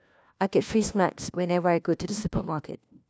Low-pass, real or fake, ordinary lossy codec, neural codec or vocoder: none; fake; none; codec, 16 kHz, 1 kbps, FunCodec, trained on LibriTTS, 50 frames a second